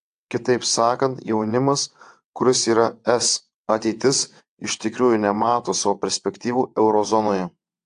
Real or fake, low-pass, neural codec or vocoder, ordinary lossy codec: fake; 9.9 kHz; vocoder, 22.05 kHz, 80 mel bands, WaveNeXt; AAC, 64 kbps